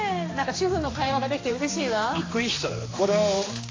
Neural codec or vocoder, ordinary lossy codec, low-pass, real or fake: codec, 16 kHz, 2 kbps, X-Codec, HuBERT features, trained on general audio; AAC, 32 kbps; 7.2 kHz; fake